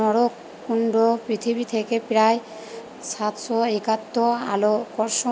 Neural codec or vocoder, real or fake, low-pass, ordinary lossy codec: none; real; none; none